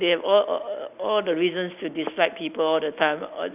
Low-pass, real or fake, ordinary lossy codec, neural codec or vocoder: 3.6 kHz; real; none; none